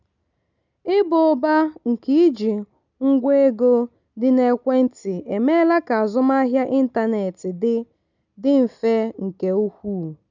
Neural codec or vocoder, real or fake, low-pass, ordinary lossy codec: none; real; 7.2 kHz; none